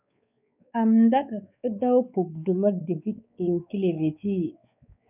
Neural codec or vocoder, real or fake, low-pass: codec, 16 kHz, 4 kbps, X-Codec, WavLM features, trained on Multilingual LibriSpeech; fake; 3.6 kHz